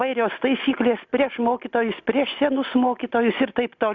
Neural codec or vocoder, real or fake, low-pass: none; real; 7.2 kHz